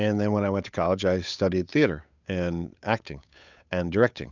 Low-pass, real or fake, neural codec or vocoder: 7.2 kHz; fake; codec, 16 kHz, 8 kbps, FunCodec, trained on Chinese and English, 25 frames a second